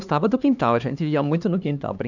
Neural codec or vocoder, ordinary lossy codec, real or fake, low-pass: codec, 16 kHz, 2 kbps, X-Codec, HuBERT features, trained on LibriSpeech; none; fake; 7.2 kHz